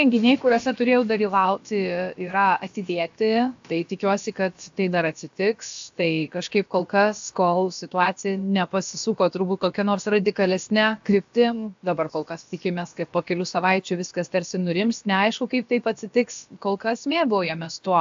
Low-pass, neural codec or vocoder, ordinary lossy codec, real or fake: 7.2 kHz; codec, 16 kHz, about 1 kbps, DyCAST, with the encoder's durations; AAC, 64 kbps; fake